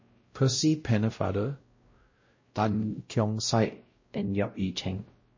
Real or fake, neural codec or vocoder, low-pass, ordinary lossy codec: fake; codec, 16 kHz, 0.5 kbps, X-Codec, WavLM features, trained on Multilingual LibriSpeech; 7.2 kHz; MP3, 32 kbps